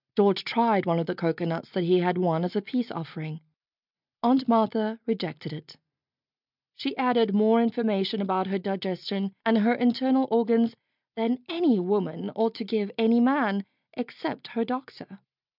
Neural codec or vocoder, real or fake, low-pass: none; real; 5.4 kHz